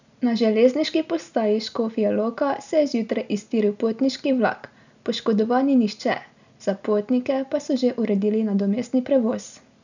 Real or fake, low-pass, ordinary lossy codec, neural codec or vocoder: real; 7.2 kHz; none; none